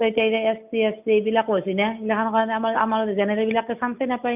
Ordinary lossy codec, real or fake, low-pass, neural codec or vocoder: none; real; 3.6 kHz; none